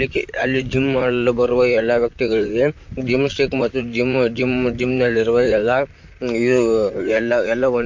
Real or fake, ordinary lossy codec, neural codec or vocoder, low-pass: fake; MP3, 48 kbps; vocoder, 44.1 kHz, 128 mel bands, Pupu-Vocoder; 7.2 kHz